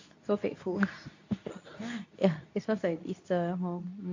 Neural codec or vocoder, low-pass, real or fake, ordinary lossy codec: codec, 24 kHz, 0.9 kbps, WavTokenizer, medium speech release version 2; 7.2 kHz; fake; AAC, 48 kbps